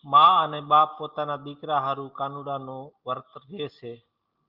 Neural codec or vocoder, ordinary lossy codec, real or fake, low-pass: none; Opus, 24 kbps; real; 5.4 kHz